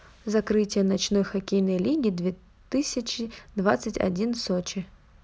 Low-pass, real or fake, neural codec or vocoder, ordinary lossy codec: none; real; none; none